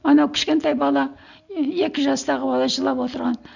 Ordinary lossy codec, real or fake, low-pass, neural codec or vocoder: none; real; 7.2 kHz; none